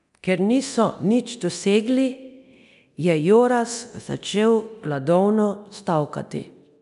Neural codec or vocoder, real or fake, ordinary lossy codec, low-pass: codec, 24 kHz, 0.9 kbps, DualCodec; fake; none; 10.8 kHz